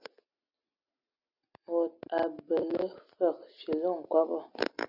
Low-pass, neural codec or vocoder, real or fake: 5.4 kHz; none; real